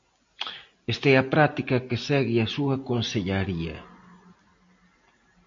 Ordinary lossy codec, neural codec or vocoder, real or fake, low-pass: MP3, 48 kbps; none; real; 7.2 kHz